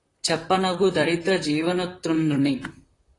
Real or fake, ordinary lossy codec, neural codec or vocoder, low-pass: fake; AAC, 32 kbps; vocoder, 44.1 kHz, 128 mel bands, Pupu-Vocoder; 10.8 kHz